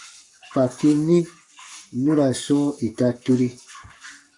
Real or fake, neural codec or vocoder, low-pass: fake; codec, 44.1 kHz, 7.8 kbps, Pupu-Codec; 10.8 kHz